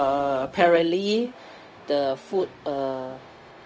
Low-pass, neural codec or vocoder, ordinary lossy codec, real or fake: none; codec, 16 kHz, 0.4 kbps, LongCat-Audio-Codec; none; fake